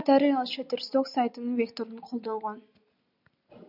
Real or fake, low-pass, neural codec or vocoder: real; 5.4 kHz; none